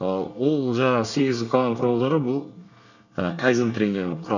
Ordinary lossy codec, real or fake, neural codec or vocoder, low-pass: none; fake; codec, 24 kHz, 1 kbps, SNAC; 7.2 kHz